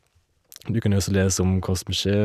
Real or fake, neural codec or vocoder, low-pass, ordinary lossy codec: real; none; 14.4 kHz; none